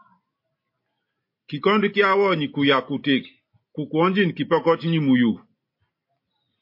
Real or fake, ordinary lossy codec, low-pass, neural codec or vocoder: real; MP3, 32 kbps; 5.4 kHz; none